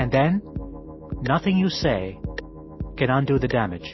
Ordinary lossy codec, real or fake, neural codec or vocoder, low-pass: MP3, 24 kbps; real; none; 7.2 kHz